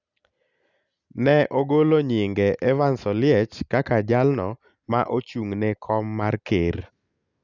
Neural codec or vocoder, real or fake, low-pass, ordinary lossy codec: none; real; 7.2 kHz; none